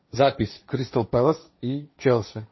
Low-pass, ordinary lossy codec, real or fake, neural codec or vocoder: 7.2 kHz; MP3, 24 kbps; fake; codec, 16 kHz, 1.1 kbps, Voila-Tokenizer